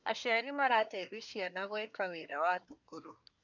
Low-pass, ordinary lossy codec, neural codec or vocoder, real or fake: 7.2 kHz; none; codec, 24 kHz, 1 kbps, SNAC; fake